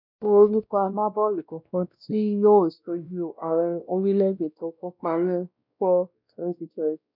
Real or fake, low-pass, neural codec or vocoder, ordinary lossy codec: fake; 5.4 kHz; codec, 16 kHz, 1 kbps, X-Codec, WavLM features, trained on Multilingual LibriSpeech; none